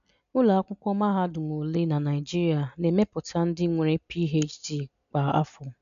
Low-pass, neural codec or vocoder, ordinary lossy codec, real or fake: 7.2 kHz; none; none; real